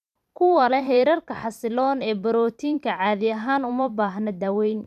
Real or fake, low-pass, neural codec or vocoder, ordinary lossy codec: fake; 14.4 kHz; vocoder, 44.1 kHz, 128 mel bands every 512 samples, BigVGAN v2; none